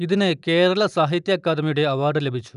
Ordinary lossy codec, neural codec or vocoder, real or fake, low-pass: none; none; real; 10.8 kHz